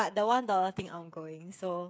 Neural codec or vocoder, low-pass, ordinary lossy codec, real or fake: codec, 16 kHz, 8 kbps, FreqCodec, smaller model; none; none; fake